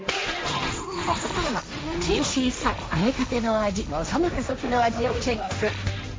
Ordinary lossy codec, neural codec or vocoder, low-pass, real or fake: none; codec, 16 kHz, 1.1 kbps, Voila-Tokenizer; none; fake